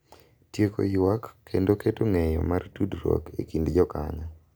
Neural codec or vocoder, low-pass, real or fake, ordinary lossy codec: none; none; real; none